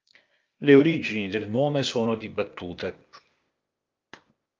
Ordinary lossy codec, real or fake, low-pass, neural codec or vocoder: Opus, 24 kbps; fake; 7.2 kHz; codec, 16 kHz, 0.8 kbps, ZipCodec